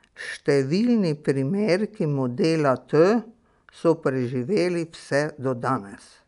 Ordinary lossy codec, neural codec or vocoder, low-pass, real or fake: none; none; 10.8 kHz; real